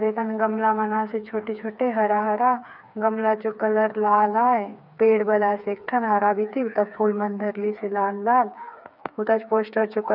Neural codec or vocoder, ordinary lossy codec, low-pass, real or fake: codec, 16 kHz, 4 kbps, FreqCodec, smaller model; none; 5.4 kHz; fake